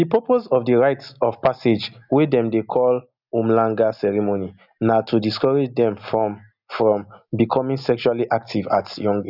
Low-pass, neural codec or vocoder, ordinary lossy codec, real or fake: 5.4 kHz; none; none; real